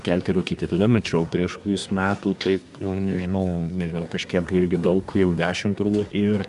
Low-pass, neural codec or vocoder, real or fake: 10.8 kHz; codec, 24 kHz, 1 kbps, SNAC; fake